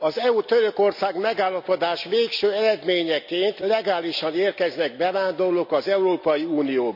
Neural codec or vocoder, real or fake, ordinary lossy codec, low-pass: none; real; none; 5.4 kHz